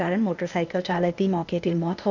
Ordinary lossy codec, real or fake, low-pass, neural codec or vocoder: none; fake; 7.2 kHz; codec, 16 kHz, 0.8 kbps, ZipCodec